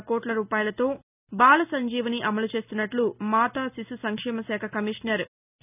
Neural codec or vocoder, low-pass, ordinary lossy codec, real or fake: none; 3.6 kHz; none; real